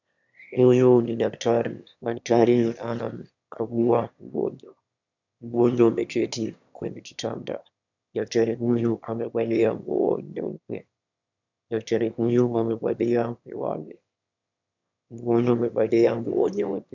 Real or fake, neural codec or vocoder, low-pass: fake; autoencoder, 22.05 kHz, a latent of 192 numbers a frame, VITS, trained on one speaker; 7.2 kHz